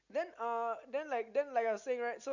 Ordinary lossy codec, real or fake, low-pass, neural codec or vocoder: none; real; 7.2 kHz; none